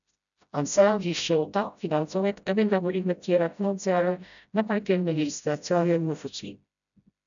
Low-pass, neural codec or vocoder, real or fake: 7.2 kHz; codec, 16 kHz, 0.5 kbps, FreqCodec, smaller model; fake